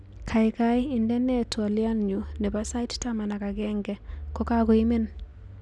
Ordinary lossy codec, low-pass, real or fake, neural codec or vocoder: none; none; real; none